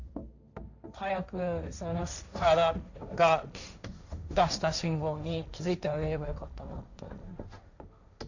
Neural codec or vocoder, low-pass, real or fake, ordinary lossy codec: codec, 16 kHz, 1.1 kbps, Voila-Tokenizer; 7.2 kHz; fake; none